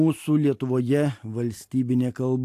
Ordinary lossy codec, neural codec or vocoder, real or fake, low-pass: AAC, 64 kbps; none; real; 14.4 kHz